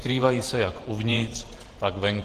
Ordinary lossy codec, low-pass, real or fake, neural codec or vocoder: Opus, 16 kbps; 14.4 kHz; fake; vocoder, 48 kHz, 128 mel bands, Vocos